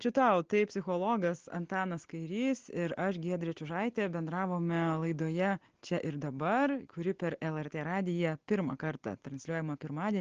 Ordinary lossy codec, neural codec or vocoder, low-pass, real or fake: Opus, 16 kbps; none; 7.2 kHz; real